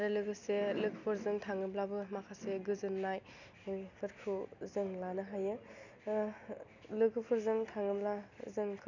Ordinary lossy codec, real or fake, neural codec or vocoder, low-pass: none; real; none; 7.2 kHz